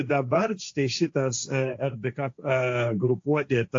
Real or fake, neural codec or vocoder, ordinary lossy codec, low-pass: fake; codec, 16 kHz, 1.1 kbps, Voila-Tokenizer; AAC, 48 kbps; 7.2 kHz